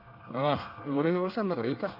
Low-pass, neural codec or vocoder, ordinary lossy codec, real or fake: 5.4 kHz; codec, 24 kHz, 1 kbps, SNAC; none; fake